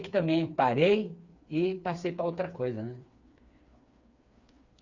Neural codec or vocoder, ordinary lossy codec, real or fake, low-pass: codec, 16 kHz, 4 kbps, FreqCodec, smaller model; Opus, 64 kbps; fake; 7.2 kHz